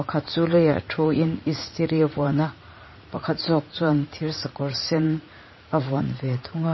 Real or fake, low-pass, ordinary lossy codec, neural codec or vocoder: fake; 7.2 kHz; MP3, 24 kbps; vocoder, 44.1 kHz, 80 mel bands, Vocos